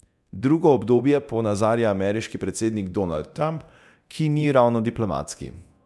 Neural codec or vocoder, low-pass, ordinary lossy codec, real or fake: codec, 24 kHz, 0.9 kbps, DualCodec; none; none; fake